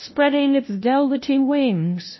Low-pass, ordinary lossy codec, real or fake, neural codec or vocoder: 7.2 kHz; MP3, 24 kbps; fake; codec, 16 kHz, 0.5 kbps, FunCodec, trained on LibriTTS, 25 frames a second